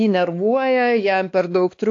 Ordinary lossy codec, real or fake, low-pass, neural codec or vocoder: AAC, 48 kbps; fake; 7.2 kHz; codec, 16 kHz, 2 kbps, X-Codec, WavLM features, trained on Multilingual LibriSpeech